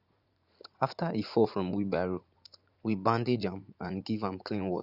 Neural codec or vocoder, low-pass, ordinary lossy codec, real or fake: vocoder, 44.1 kHz, 80 mel bands, Vocos; 5.4 kHz; Opus, 64 kbps; fake